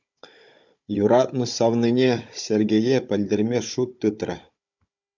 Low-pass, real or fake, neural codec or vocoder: 7.2 kHz; fake; codec, 16 kHz, 16 kbps, FunCodec, trained on Chinese and English, 50 frames a second